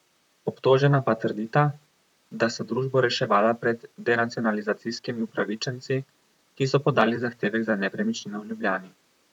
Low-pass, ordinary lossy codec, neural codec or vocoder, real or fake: 19.8 kHz; none; vocoder, 44.1 kHz, 128 mel bands, Pupu-Vocoder; fake